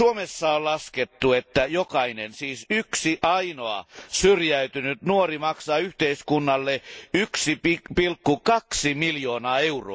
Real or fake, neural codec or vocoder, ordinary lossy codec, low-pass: real; none; none; none